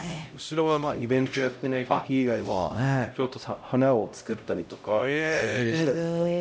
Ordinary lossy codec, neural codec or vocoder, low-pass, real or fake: none; codec, 16 kHz, 0.5 kbps, X-Codec, HuBERT features, trained on LibriSpeech; none; fake